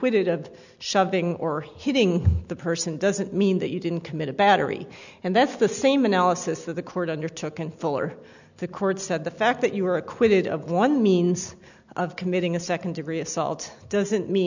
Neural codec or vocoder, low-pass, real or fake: none; 7.2 kHz; real